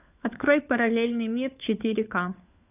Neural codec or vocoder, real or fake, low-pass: codec, 16 kHz, 4 kbps, FunCodec, trained on LibriTTS, 50 frames a second; fake; 3.6 kHz